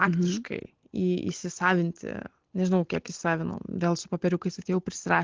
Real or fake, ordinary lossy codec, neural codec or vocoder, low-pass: real; Opus, 16 kbps; none; 7.2 kHz